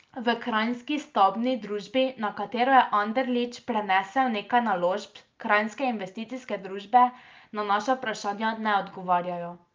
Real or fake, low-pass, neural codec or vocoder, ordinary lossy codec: real; 7.2 kHz; none; Opus, 24 kbps